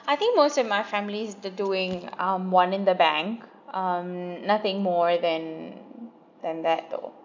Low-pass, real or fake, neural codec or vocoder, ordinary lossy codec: 7.2 kHz; real; none; none